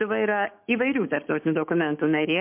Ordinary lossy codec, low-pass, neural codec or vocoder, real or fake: MP3, 32 kbps; 3.6 kHz; codec, 16 kHz, 6 kbps, DAC; fake